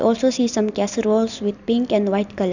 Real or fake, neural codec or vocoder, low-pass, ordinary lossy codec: real; none; 7.2 kHz; none